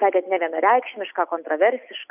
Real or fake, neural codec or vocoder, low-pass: real; none; 3.6 kHz